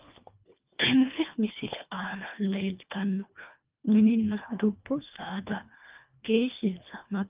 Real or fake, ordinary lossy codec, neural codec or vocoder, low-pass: fake; Opus, 24 kbps; codec, 24 kHz, 1.5 kbps, HILCodec; 3.6 kHz